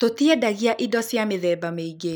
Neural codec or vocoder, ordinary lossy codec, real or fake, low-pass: none; none; real; none